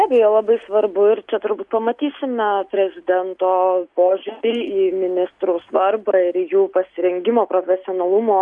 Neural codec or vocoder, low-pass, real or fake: none; 10.8 kHz; real